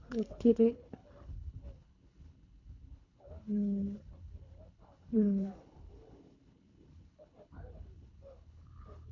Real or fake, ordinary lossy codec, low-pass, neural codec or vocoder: fake; none; 7.2 kHz; codec, 24 kHz, 3 kbps, HILCodec